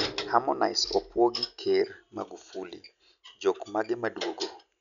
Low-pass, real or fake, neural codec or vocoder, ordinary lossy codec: 7.2 kHz; real; none; none